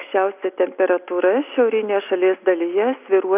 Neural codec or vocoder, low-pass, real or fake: none; 3.6 kHz; real